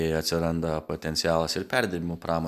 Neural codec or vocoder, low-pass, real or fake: none; 14.4 kHz; real